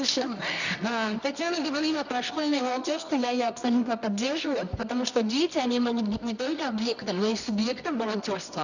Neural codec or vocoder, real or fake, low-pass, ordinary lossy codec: codec, 24 kHz, 0.9 kbps, WavTokenizer, medium music audio release; fake; 7.2 kHz; none